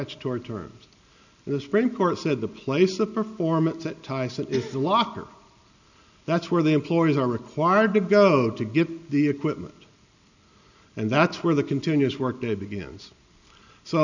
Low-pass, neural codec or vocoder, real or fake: 7.2 kHz; none; real